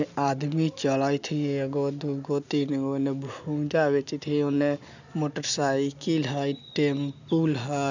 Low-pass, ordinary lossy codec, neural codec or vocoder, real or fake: 7.2 kHz; none; none; real